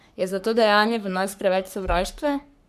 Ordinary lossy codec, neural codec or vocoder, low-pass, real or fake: none; codec, 44.1 kHz, 3.4 kbps, Pupu-Codec; 14.4 kHz; fake